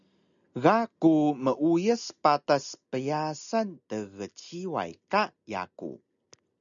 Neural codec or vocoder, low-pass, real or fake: none; 7.2 kHz; real